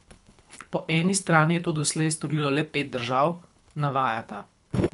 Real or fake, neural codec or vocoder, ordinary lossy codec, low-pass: fake; codec, 24 kHz, 3 kbps, HILCodec; none; 10.8 kHz